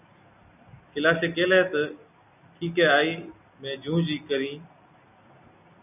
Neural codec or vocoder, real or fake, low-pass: none; real; 3.6 kHz